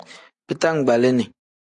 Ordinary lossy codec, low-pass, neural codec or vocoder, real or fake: AAC, 64 kbps; 10.8 kHz; none; real